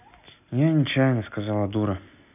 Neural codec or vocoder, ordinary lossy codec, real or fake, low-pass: none; none; real; 3.6 kHz